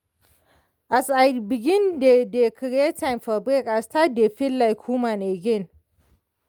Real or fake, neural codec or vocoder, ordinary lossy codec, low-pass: real; none; Opus, 32 kbps; 19.8 kHz